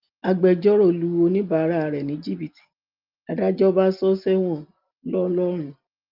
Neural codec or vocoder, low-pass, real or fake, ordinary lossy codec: none; 5.4 kHz; real; Opus, 24 kbps